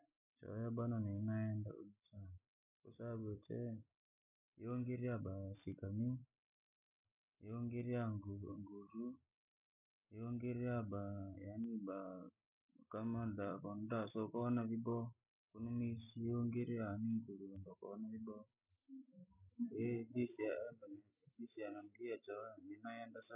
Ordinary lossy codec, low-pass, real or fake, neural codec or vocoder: none; 3.6 kHz; real; none